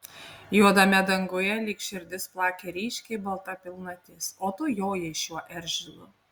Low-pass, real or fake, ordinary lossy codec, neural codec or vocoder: 19.8 kHz; real; Opus, 64 kbps; none